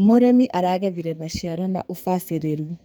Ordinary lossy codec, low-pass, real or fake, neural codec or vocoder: none; none; fake; codec, 44.1 kHz, 2.6 kbps, SNAC